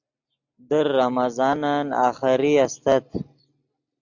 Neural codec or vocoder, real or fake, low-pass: none; real; 7.2 kHz